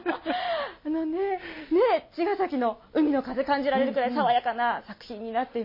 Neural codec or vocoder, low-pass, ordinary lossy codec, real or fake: none; 5.4 kHz; MP3, 24 kbps; real